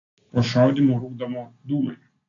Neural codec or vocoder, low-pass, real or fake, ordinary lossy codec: none; 7.2 kHz; real; AAC, 48 kbps